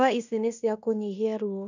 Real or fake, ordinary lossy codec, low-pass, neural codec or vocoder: fake; none; 7.2 kHz; codec, 16 kHz in and 24 kHz out, 0.9 kbps, LongCat-Audio-Codec, fine tuned four codebook decoder